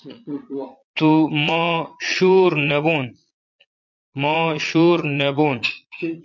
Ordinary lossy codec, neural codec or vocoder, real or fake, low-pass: MP3, 48 kbps; vocoder, 22.05 kHz, 80 mel bands, Vocos; fake; 7.2 kHz